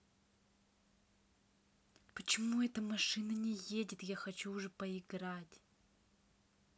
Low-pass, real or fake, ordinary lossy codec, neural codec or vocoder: none; real; none; none